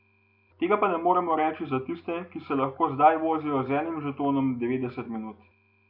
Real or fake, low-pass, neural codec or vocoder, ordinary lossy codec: real; 5.4 kHz; none; none